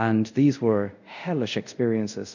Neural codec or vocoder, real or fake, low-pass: codec, 24 kHz, 0.5 kbps, DualCodec; fake; 7.2 kHz